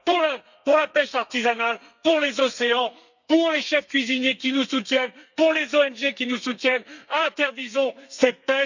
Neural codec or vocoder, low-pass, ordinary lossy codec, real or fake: codec, 32 kHz, 1.9 kbps, SNAC; 7.2 kHz; none; fake